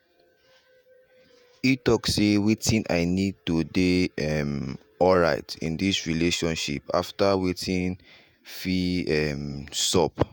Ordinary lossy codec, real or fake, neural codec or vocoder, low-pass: none; fake; vocoder, 48 kHz, 128 mel bands, Vocos; none